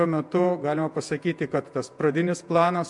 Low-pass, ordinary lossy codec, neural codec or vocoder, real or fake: 10.8 kHz; MP3, 64 kbps; vocoder, 48 kHz, 128 mel bands, Vocos; fake